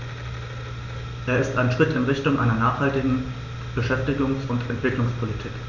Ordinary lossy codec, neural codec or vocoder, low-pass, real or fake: none; none; 7.2 kHz; real